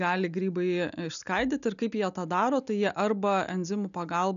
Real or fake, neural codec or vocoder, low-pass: real; none; 7.2 kHz